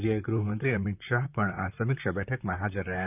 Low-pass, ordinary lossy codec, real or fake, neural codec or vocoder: 3.6 kHz; none; fake; vocoder, 44.1 kHz, 128 mel bands, Pupu-Vocoder